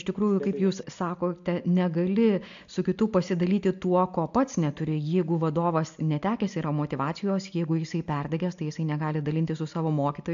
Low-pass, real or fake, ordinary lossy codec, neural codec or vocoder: 7.2 kHz; real; AAC, 64 kbps; none